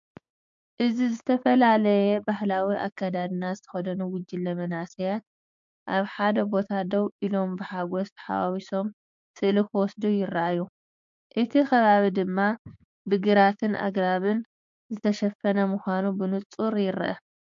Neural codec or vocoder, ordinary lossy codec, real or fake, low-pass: codec, 16 kHz, 6 kbps, DAC; MP3, 48 kbps; fake; 7.2 kHz